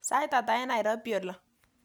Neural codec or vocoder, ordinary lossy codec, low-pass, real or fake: none; none; none; real